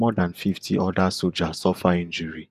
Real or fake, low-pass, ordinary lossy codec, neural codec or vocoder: fake; 14.4 kHz; none; vocoder, 48 kHz, 128 mel bands, Vocos